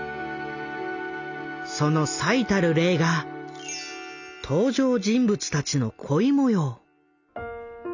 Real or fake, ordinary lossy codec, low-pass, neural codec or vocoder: real; none; 7.2 kHz; none